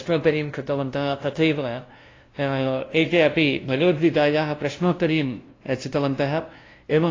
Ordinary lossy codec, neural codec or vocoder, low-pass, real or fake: AAC, 32 kbps; codec, 16 kHz, 0.5 kbps, FunCodec, trained on LibriTTS, 25 frames a second; 7.2 kHz; fake